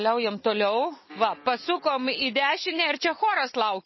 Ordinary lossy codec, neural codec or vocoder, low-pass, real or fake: MP3, 24 kbps; none; 7.2 kHz; real